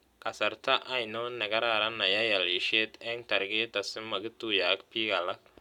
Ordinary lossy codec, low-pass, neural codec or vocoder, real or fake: none; 19.8 kHz; none; real